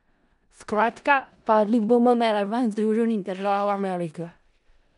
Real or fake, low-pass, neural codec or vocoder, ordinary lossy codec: fake; 10.8 kHz; codec, 16 kHz in and 24 kHz out, 0.4 kbps, LongCat-Audio-Codec, four codebook decoder; none